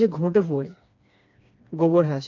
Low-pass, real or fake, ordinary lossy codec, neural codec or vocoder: 7.2 kHz; fake; AAC, 48 kbps; codec, 16 kHz, 2 kbps, FreqCodec, smaller model